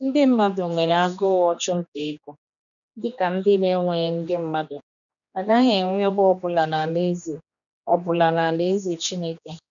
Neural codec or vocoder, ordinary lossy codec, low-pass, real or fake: codec, 16 kHz, 2 kbps, X-Codec, HuBERT features, trained on general audio; none; 7.2 kHz; fake